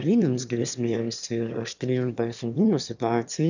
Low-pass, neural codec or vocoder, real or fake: 7.2 kHz; autoencoder, 22.05 kHz, a latent of 192 numbers a frame, VITS, trained on one speaker; fake